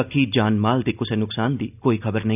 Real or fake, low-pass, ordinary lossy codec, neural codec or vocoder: real; 3.6 kHz; none; none